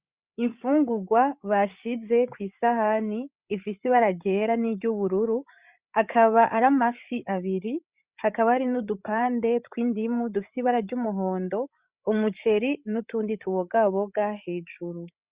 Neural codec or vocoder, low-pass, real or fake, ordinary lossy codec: codec, 16 kHz, 8 kbps, FreqCodec, larger model; 3.6 kHz; fake; Opus, 64 kbps